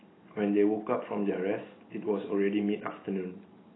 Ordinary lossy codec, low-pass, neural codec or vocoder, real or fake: AAC, 16 kbps; 7.2 kHz; none; real